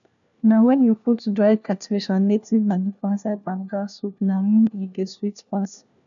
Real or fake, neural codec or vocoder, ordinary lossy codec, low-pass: fake; codec, 16 kHz, 1 kbps, FunCodec, trained on LibriTTS, 50 frames a second; none; 7.2 kHz